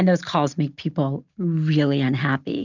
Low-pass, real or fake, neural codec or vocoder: 7.2 kHz; real; none